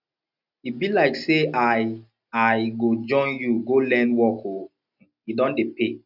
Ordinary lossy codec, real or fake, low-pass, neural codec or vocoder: none; real; 5.4 kHz; none